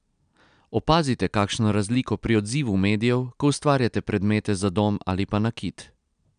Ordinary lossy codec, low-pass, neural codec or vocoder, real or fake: none; 9.9 kHz; none; real